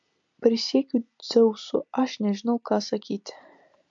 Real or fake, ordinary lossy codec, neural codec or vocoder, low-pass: real; MP3, 48 kbps; none; 7.2 kHz